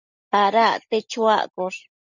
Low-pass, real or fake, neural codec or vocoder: 7.2 kHz; real; none